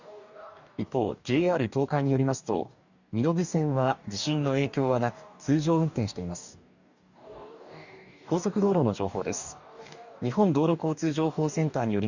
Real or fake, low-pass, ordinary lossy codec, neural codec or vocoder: fake; 7.2 kHz; none; codec, 44.1 kHz, 2.6 kbps, DAC